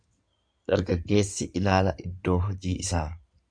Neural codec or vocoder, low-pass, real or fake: codec, 16 kHz in and 24 kHz out, 2.2 kbps, FireRedTTS-2 codec; 9.9 kHz; fake